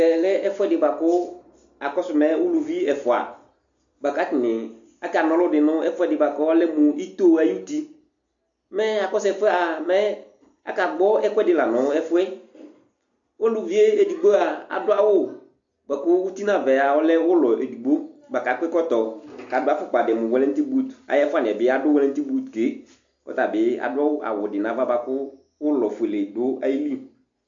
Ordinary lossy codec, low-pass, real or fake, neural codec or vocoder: AAC, 64 kbps; 7.2 kHz; real; none